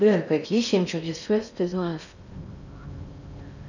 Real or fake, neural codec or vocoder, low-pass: fake; codec, 16 kHz in and 24 kHz out, 0.6 kbps, FocalCodec, streaming, 4096 codes; 7.2 kHz